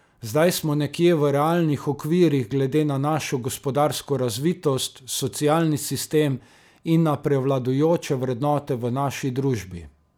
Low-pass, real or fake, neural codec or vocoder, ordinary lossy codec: none; real; none; none